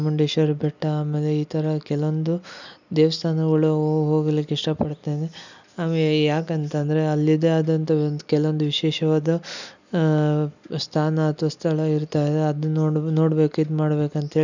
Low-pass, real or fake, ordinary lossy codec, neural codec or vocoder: 7.2 kHz; real; none; none